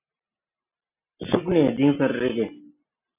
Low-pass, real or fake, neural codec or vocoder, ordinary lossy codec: 3.6 kHz; real; none; AAC, 32 kbps